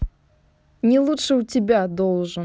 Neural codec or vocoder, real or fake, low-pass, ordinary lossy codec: none; real; none; none